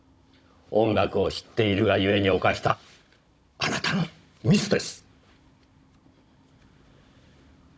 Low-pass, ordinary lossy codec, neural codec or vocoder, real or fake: none; none; codec, 16 kHz, 16 kbps, FunCodec, trained on Chinese and English, 50 frames a second; fake